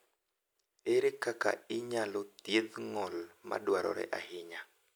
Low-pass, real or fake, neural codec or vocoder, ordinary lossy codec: none; real; none; none